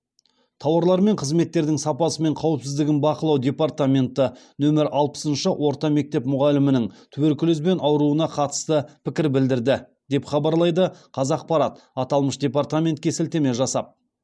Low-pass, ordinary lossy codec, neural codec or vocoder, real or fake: none; none; none; real